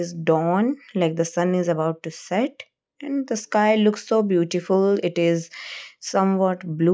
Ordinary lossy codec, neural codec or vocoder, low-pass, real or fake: none; none; none; real